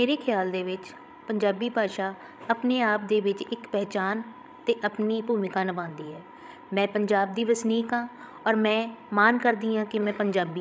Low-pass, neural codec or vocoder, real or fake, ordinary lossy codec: none; codec, 16 kHz, 16 kbps, FreqCodec, larger model; fake; none